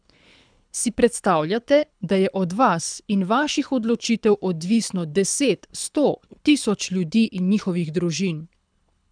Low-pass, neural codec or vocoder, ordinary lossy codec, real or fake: 9.9 kHz; codec, 24 kHz, 6 kbps, HILCodec; none; fake